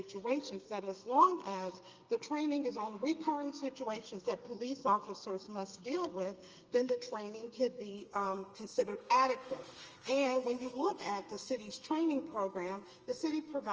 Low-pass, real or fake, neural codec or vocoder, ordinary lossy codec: 7.2 kHz; fake; codec, 32 kHz, 1.9 kbps, SNAC; Opus, 24 kbps